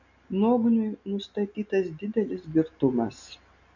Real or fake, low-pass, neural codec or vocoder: real; 7.2 kHz; none